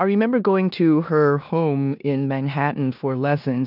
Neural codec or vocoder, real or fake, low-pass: codec, 16 kHz in and 24 kHz out, 0.9 kbps, LongCat-Audio-Codec, four codebook decoder; fake; 5.4 kHz